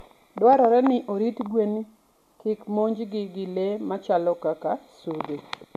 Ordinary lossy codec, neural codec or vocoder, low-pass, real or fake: none; none; 14.4 kHz; real